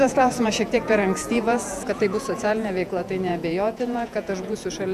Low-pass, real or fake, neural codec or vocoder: 14.4 kHz; fake; vocoder, 48 kHz, 128 mel bands, Vocos